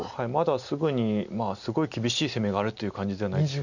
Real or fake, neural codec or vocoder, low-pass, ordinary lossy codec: real; none; 7.2 kHz; none